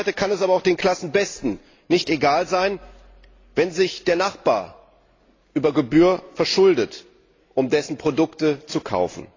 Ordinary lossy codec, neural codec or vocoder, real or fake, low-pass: AAC, 32 kbps; none; real; 7.2 kHz